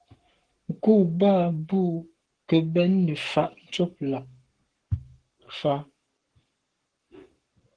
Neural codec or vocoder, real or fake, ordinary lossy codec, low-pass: codec, 44.1 kHz, 7.8 kbps, Pupu-Codec; fake; Opus, 16 kbps; 9.9 kHz